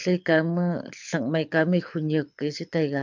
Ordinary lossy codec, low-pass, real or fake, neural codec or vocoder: MP3, 64 kbps; 7.2 kHz; fake; codec, 24 kHz, 6 kbps, HILCodec